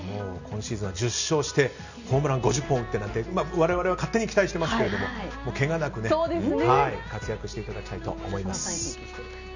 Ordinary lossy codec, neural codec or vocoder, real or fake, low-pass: none; none; real; 7.2 kHz